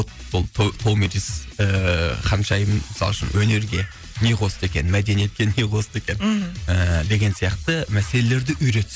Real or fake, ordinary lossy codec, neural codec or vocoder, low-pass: real; none; none; none